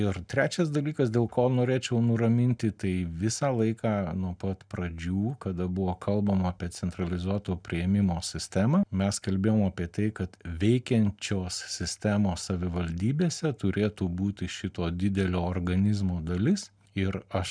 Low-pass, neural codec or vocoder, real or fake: 9.9 kHz; none; real